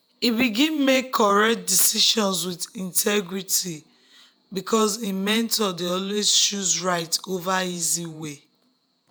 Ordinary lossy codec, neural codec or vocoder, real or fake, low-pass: none; vocoder, 48 kHz, 128 mel bands, Vocos; fake; none